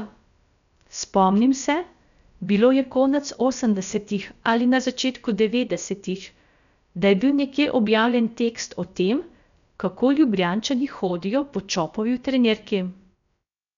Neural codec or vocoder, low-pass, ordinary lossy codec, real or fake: codec, 16 kHz, about 1 kbps, DyCAST, with the encoder's durations; 7.2 kHz; none; fake